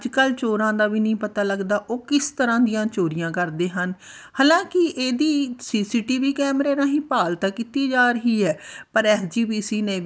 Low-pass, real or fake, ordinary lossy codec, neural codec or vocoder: none; real; none; none